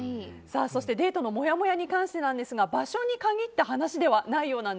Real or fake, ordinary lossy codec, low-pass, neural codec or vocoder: real; none; none; none